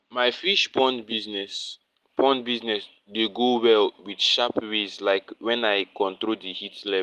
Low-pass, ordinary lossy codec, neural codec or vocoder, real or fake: 14.4 kHz; Opus, 24 kbps; none; real